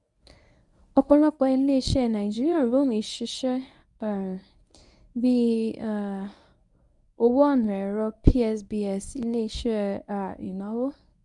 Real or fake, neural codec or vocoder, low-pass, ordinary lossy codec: fake; codec, 24 kHz, 0.9 kbps, WavTokenizer, medium speech release version 1; 10.8 kHz; none